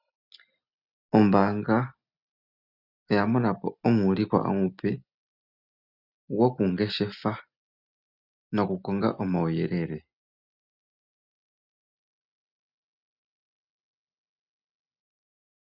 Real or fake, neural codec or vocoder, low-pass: real; none; 5.4 kHz